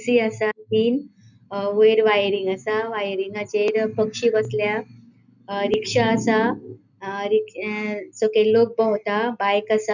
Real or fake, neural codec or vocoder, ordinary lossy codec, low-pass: real; none; none; 7.2 kHz